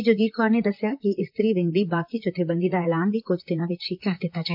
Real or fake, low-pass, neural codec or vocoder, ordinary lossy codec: fake; 5.4 kHz; vocoder, 44.1 kHz, 128 mel bands, Pupu-Vocoder; AAC, 48 kbps